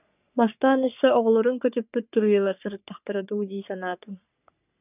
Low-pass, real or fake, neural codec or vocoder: 3.6 kHz; fake; codec, 44.1 kHz, 3.4 kbps, Pupu-Codec